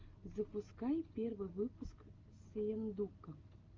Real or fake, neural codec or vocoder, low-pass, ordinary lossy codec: real; none; 7.2 kHz; Opus, 32 kbps